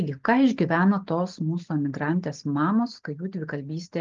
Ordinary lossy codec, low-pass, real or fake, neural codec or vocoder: Opus, 32 kbps; 7.2 kHz; real; none